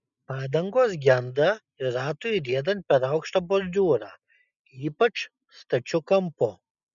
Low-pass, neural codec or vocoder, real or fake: 7.2 kHz; none; real